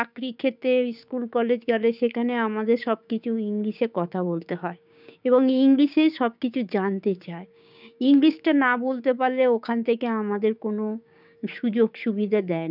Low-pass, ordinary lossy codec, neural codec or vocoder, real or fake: 5.4 kHz; none; codec, 16 kHz, 6 kbps, DAC; fake